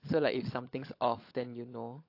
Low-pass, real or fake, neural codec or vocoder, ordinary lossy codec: 5.4 kHz; fake; codec, 16 kHz, 16 kbps, FunCodec, trained on LibriTTS, 50 frames a second; AAC, 32 kbps